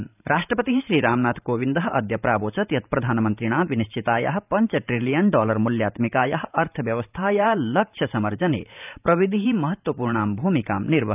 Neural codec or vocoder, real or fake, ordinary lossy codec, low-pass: vocoder, 44.1 kHz, 128 mel bands every 512 samples, BigVGAN v2; fake; none; 3.6 kHz